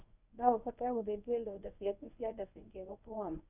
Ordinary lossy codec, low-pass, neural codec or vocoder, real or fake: none; 3.6 kHz; codec, 24 kHz, 0.9 kbps, WavTokenizer, medium speech release version 1; fake